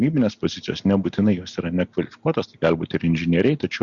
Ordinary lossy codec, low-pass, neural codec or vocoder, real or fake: Opus, 64 kbps; 7.2 kHz; none; real